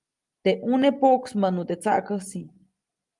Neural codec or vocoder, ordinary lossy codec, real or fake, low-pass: none; Opus, 32 kbps; real; 10.8 kHz